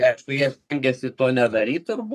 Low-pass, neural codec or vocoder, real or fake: 14.4 kHz; codec, 44.1 kHz, 3.4 kbps, Pupu-Codec; fake